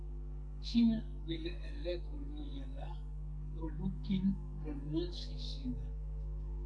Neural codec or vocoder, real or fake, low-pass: codec, 44.1 kHz, 2.6 kbps, SNAC; fake; 9.9 kHz